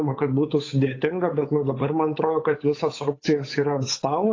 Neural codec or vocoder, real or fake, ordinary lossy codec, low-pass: codec, 16 kHz, 8 kbps, FunCodec, trained on Chinese and English, 25 frames a second; fake; AAC, 32 kbps; 7.2 kHz